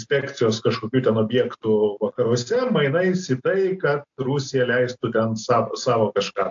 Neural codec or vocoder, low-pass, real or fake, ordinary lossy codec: none; 7.2 kHz; real; AAC, 64 kbps